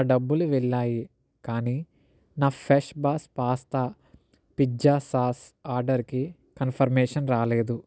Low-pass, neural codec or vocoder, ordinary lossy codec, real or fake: none; none; none; real